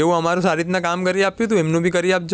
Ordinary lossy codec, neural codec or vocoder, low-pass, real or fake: none; none; none; real